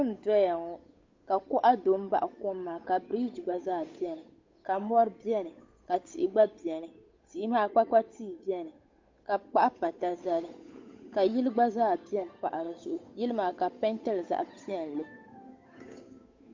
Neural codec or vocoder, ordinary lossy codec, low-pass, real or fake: codec, 16 kHz, 8 kbps, FunCodec, trained on Chinese and English, 25 frames a second; MP3, 48 kbps; 7.2 kHz; fake